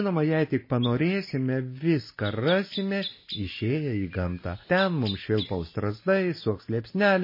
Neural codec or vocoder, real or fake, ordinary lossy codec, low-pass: none; real; MP3, 24 kbps; 5.4 kHz